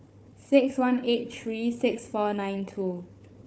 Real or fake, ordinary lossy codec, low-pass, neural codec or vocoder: fake; none; none; codec, 16 kHz, 4 kbps, FunCodec, trained on Chinese and English, 50 frames a second